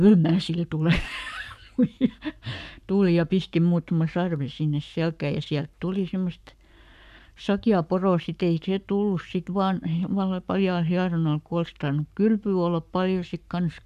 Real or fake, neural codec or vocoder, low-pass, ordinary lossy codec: fake; codec, 44.1 kHz, 7.8 kbps, Pupu-Codec; 14.4 kHz; none